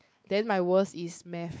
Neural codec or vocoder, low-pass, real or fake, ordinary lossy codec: codec, 16 kHz, 4 kbps, X-Codec, WavLM features, trained on Multilingual LibriSpeech; none; fake; none